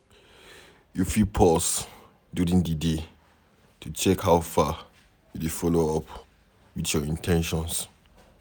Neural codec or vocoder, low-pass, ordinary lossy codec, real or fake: none; none; none; real